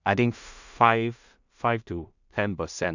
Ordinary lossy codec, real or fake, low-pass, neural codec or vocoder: none; fake; 7.2 kHz; codec, 16 kHz in and 24 kHz out, 0.4 kbps, LongCat-Audio-Codec, two codebook decoder